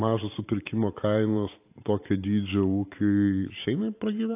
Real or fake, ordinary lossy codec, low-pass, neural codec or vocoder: fake; AAC, 24 kbps; 3.6 kHz; vocoder, 44.1 kHz, 128 mel bands every 512 samples, BigVGAN v2